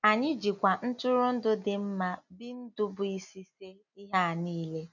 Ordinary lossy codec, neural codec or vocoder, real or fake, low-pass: none; none; real; 7.2 kHz